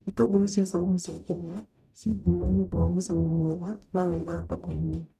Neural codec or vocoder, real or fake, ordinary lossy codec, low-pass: codec, 44.1 kHz, 0.9 kbps, DAC; fake; none; 14.4 kHz